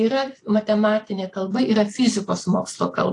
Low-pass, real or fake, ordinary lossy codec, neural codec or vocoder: 10.8 kHz; fake; AAC, 48 kbps; vocoder, 44.1 kHz, 128 mel bands every 256 samples, BigVGAN v2